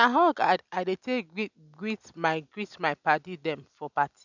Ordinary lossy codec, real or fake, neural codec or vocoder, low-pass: none; real; none; 7.2 kHz